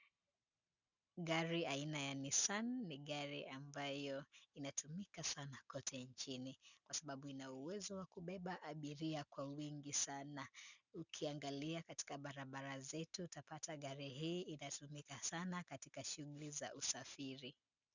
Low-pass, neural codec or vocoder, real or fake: 7.2 kHz; none; real